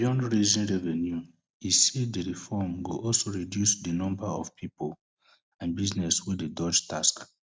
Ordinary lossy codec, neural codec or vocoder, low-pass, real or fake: none; none; none; real